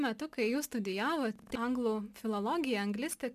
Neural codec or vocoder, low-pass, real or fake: none; 14.4 kHz; real